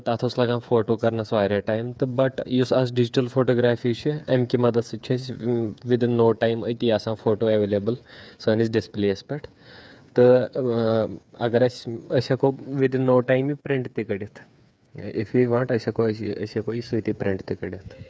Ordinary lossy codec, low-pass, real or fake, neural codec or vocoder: none; none; fake; codec, 16 kHz, 8 kbps, FreqCodec, smaller model